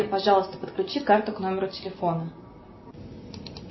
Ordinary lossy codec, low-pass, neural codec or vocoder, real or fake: MP3, 24 kbps; 7.2 kHz; none; real